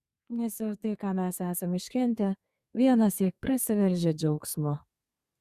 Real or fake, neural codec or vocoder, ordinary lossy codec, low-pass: fake; codec, 32 kHz, 1.9 kbps, SNAC; Opus, 64 kbps; 14.4 kHz